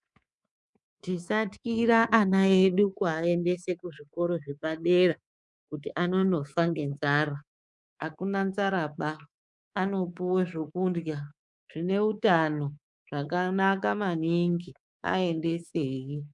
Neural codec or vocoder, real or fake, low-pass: codec, 24 kHz, 3.1 kbps, DualCodec; fake; 10.8 kHz